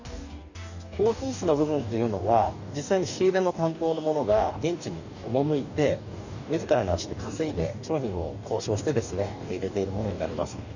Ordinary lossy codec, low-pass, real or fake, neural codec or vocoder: none; 7.2 kHz; fake; codec, 44.1 kHz, 2.6 kbps, DAC